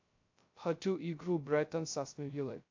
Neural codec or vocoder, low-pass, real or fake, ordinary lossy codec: codec, 16 kHz, 0.2 kbps, FocalCodec; 7.2 kHz; fake; AAC, 48 kbps